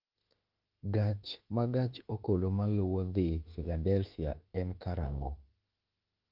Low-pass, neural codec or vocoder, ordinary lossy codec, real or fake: 5.4 kHz; autoencoder, 48 kHz, 32 numbers a frame, DAC-VAE, trained on Japanese speech; Opus, 24 kbps; fake